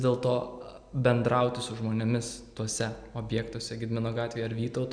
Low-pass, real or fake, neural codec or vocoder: 9.9 kHz; real; none